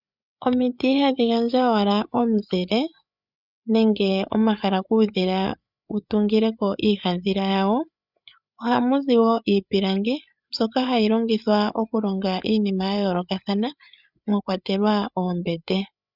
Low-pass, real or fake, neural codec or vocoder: 5.4 kHz; fake; codec, 16 kHz, 8 kbps, FreqCodec, larger model